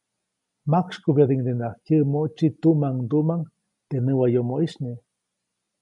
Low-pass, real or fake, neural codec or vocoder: 10.8 kHz; real; none